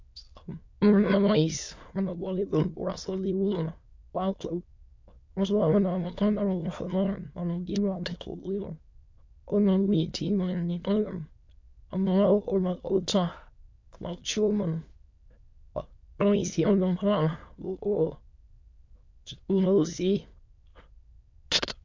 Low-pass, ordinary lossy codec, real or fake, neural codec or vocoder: 7.2 kHz; MP3, 48 kbps; fake; autoencoder, 22.05 kHz, a latent of 192 numbers a frame, VITS, trained on many speakers